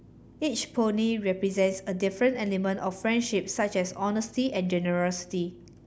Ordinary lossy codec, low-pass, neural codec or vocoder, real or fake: none; none; none; real